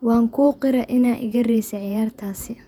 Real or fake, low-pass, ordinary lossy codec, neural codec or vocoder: fake; 19.8 kHz; none; vocoder, 48 kHz, 128 mel bands, Vocos